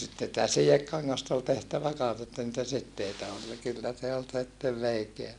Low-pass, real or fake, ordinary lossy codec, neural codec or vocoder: 10.8 kHz; real; none; none